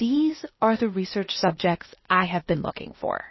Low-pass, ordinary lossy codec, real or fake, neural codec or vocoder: 7.2 kHz; MP3, 24 kbps; fake; codec, 16 kHz, 0.8 kbps, ZipCodec